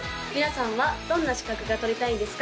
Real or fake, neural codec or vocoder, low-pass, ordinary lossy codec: real; none; none; none